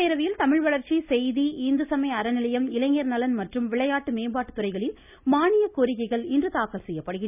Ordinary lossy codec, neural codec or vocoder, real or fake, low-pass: none; none; real; 3.6 kHz